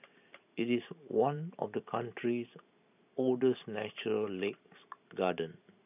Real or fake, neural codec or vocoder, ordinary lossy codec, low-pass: fake; vocoder, 44.1 kHz, 128 mel bands every 512 samples, BigVGAN v2; none; 3.6 kHz